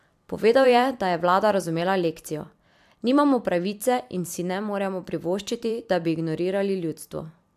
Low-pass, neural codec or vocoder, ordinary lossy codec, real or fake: 14.4 kHz; vocoder, 44.1 kHz, 128 mel bands every 256 samples, BigVGAN v2; AAC, 96 kbps; fake